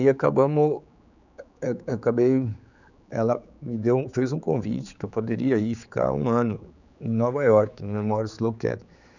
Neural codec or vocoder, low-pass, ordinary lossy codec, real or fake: codec, 16 kHz, 4 kbps, X-Codec, HuBERT features, trained on general audio; 7.2 kHz; none; fake